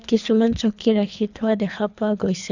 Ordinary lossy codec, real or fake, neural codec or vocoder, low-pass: none; fake; codec, 24 kHz, 3 kbps, HILCodec; 7.2 kHz